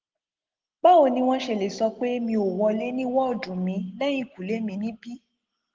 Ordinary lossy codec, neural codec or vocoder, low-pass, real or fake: Opus, 16 kbps; none; 7.2 kHz; real